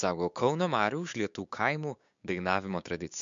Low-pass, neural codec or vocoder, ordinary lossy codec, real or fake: 7.2 kHz; codec, 16 kHz, 6 kbps, DAC; MP3, 64 kbps; fake